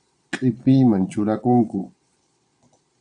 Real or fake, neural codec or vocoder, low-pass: fake; vocoder, 22.05 kHz, 80 mel bands, Vocos; 9.9 kHz